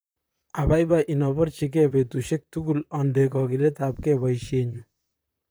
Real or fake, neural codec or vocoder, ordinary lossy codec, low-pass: fake; vocoder, 44.1 kHz, 128 mel bands, Pupu-Vocoder; none; none